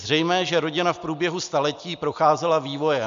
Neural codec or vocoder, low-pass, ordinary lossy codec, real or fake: none; 7.2 kHz; MP3, 64 kbps; real